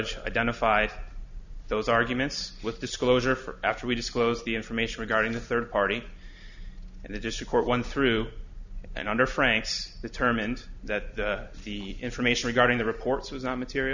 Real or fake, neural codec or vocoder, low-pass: real; none; 7.2 kHz